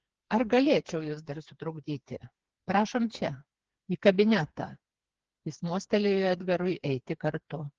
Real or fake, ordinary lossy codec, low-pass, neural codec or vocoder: fake; Opus, 16 kbps; 7.2 kHz; codec, 16 kHz, 4 kbps, FreqCodec, smaller model